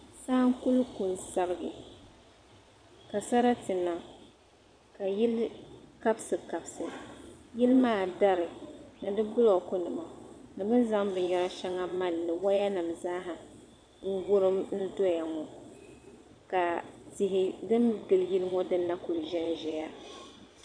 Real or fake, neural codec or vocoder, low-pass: fake; vocoder, 22.05 kHz, 80 mel bands, Vocos; 9.9 kHz